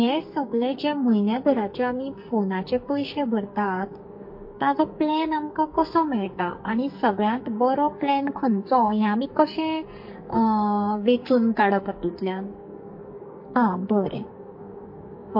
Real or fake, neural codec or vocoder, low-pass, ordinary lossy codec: fake; codec, 44.1 kHz, 2.6 kbps, SNAC; 5.4 kHz; MP3, 32 kbps